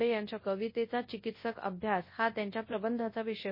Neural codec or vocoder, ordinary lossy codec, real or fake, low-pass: codec, 24 kHz, 0.9 kbps, WavTokenizer, large speech release; MP3, 24 kbps; fake; 5.4 kHz